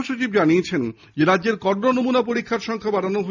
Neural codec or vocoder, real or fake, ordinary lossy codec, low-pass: none; real; none; 7.2 kHz